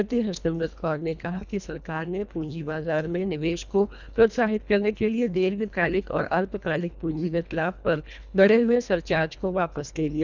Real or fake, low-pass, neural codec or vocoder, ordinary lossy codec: fake; 7.2 kHz; codec, 24 kHz, 1.5 kbps, HILCodec; none